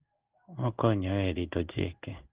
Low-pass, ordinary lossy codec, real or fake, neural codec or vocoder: 3.6 kHz; Opus, 16 kbps; fake; codec, 16 kHz in and 24 kHz out, 1 kbps, XY-Tokenizer